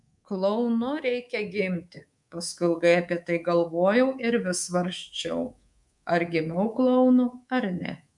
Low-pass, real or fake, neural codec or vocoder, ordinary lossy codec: 10.8 kHz; fake; codec, 24 kHz, 3.1 kbps, DualCodec; MP3, 96 kbps